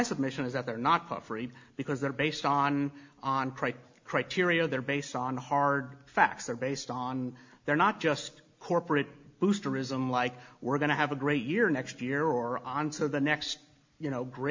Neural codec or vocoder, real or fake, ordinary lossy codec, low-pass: none; real; MP3, 64 kbps; 7.2 kHz